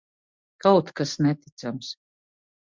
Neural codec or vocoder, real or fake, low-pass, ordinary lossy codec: none; real; 7.2 kHz; MP3, 64 kbps